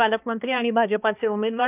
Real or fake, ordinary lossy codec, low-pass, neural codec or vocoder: fake; none; 3.6 kHz; codec, 16 kHz, 2 kbps, X-Codec, HuBERT features, trained on balanced general audio